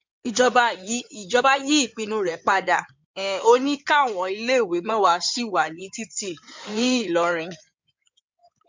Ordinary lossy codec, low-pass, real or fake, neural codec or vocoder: MP3, 64 kbps; 7.2 kHz; fake; codec, 16 kHz in and 24 kHz out, 2.2 kbps, FireRedTTS-2 codec